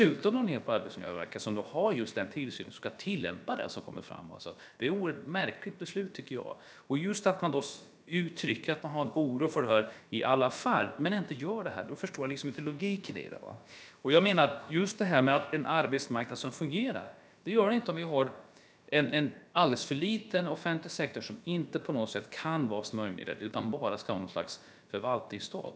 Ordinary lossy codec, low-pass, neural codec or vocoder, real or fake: none; none; codec, 16 kHz, about 1 kbps, DyCAST, with the encoder's durations; fake